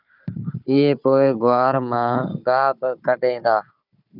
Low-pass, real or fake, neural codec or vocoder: 5.4 kHz; fake; codec, 16 kHz, 4 kbps, FunCodec, trained on Chinese and English, 50 frames a second